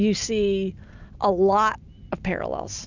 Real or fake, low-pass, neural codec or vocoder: real; 7.2 kHz; none